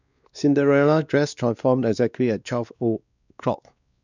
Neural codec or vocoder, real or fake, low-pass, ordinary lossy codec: codec, 16 kHz, 2 kbps, X-Codec, WavLM features, trained on Multilingual LibriSpeech; fake; 7.2 kHz; none